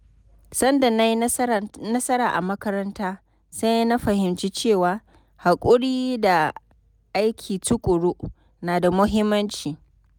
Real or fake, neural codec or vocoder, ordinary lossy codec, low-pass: real; none; none; none